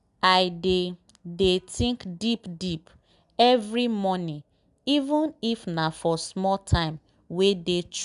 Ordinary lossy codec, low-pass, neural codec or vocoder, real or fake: none; 10.8 kHz; none; real